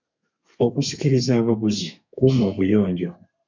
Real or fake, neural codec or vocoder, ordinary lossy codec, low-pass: fake; codec, 32 kHz, 1.9 kbps, SNAC; MP3, 64 kbps; 7.2 kHz